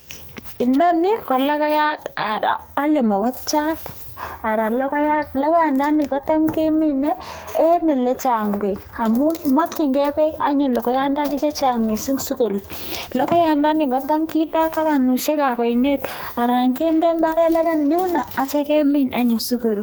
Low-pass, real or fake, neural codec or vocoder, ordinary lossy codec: none; fake; codec, 44.1 kHz, 2.6 kbps, SNAC; none